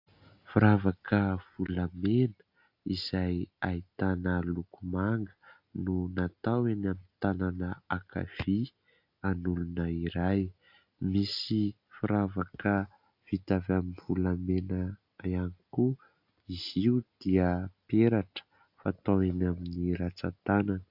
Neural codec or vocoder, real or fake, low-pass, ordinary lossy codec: none; real; 5.4 kHz; AAC, 48 kbps